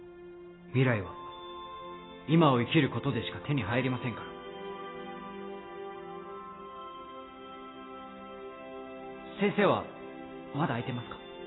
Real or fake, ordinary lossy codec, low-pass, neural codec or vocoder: real; AAC, 16 kbps; 7.2 kHz; none